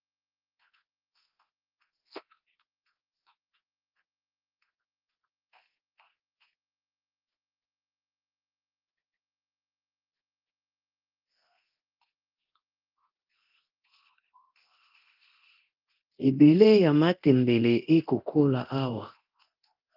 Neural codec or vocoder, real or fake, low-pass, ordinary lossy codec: codec, 24 kHz, 0.9 kbps, DualCodec; fake; 5.4 kHz; Opus, 24 kbps